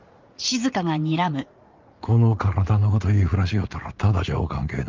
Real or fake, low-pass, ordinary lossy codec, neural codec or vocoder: fake; 7.2 kHz; Opus, 24 kbps; vocoder, 44.1 kHz, 80 mel bands, Vocos